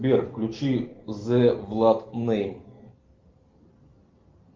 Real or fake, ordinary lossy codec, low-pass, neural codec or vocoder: real; Opus, 32 kbps; 7.2 kHz; none